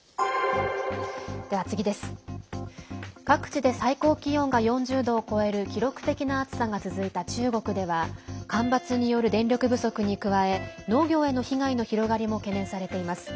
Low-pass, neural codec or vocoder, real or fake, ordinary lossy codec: none; none; real; none